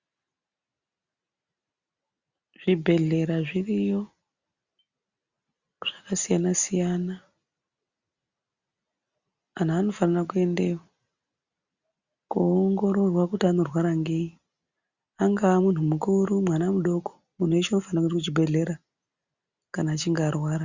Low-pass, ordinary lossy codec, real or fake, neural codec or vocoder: 7.2 kHz; Opus, 64 kbps; real; none